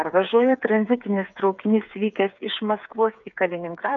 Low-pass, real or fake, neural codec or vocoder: 7.2 kHz; fake; codec, 16 kHz, 8 kbps, FreqCodec, smaller model